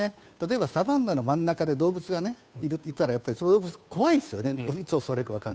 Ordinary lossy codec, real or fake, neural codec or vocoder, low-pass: none; fake; codec, 16 kHz, 2 kbps, FunCodec, trained on Chinese and English, 25 frames a second; none